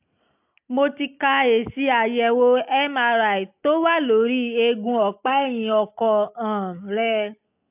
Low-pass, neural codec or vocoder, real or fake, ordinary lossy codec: 3.6 kHz; none; real; AAC, 32 kbps